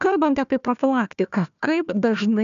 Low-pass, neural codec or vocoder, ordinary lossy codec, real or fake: 7.2 kHz; codec, 16 kHz, 1 kbps, FunCodec, trained on Chinese and English, 50 frames a second; MP3, 96 kbps; fake